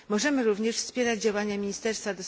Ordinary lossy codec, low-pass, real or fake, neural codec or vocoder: none; none; real; none